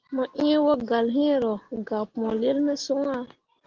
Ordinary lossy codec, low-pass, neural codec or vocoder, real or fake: Opus, 16 kbps; 7.2 kHz; none; real